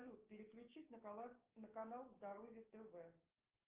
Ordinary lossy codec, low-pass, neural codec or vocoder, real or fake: Opus, 32 kbps; 3.6 kHz; codec, 16 kHz, 6 kbps, DAC; fake